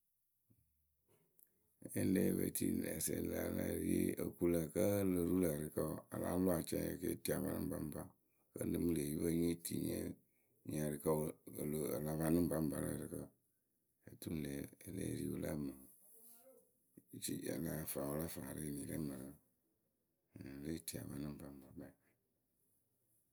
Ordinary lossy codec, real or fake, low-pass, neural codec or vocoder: none; real; none; none